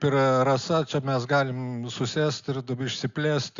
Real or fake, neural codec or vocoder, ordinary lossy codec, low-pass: real; none; Opus, 64 kbps; 7.2 kHz